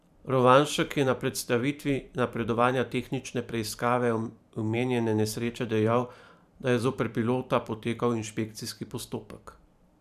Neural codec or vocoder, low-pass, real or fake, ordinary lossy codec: vocoder, 44.1 kHz, 128 mel bands every 512 samples, BigVGAN v2; 14.4 kHz; fake; none